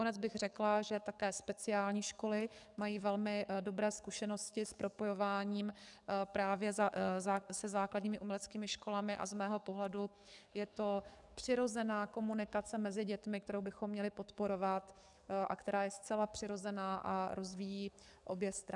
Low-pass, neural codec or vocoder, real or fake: 10.8 kHz; codec, 44.1 kHz, 7.8 kbps, DAC; fake